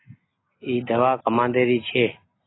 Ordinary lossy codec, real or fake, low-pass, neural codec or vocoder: AAC, 16 kbps; real; 7.2 kHz; none